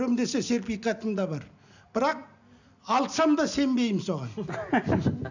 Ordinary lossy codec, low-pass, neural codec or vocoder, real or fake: none; 7.2 kHz; none; real